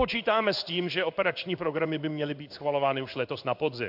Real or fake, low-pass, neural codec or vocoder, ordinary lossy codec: fake; 5.4 kHz; codec, 16 kHz in and 24 kHz out, 1 kbps, XY-Tokenizer; AAC, 48 kbps